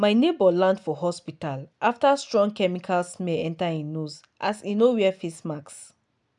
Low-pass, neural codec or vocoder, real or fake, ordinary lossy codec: 10.8 kHz; none; real; none